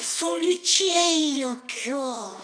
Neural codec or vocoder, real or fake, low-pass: codec, 24 kHz, 0.9 kbps, WavTokenizer, medium music audio release; fake; 9.9 kHz